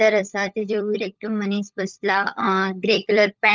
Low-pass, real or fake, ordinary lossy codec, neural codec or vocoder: 7.2 kHz; fake; Opus, 32 kbps; codec, 16 kHz, 16 kbps, FunCodec, trained on LibriTTS, 50 frames a second